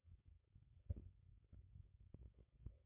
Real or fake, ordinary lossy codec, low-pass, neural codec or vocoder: fake; MP3, 16 kbps; 3.6 kHz; codec, 16 kHz, 4.8 kbps, FACodec